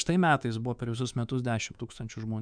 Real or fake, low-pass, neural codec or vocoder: fake; 9.9 kHz; codec, 24 kHz, 3.1 kbps, DualCodec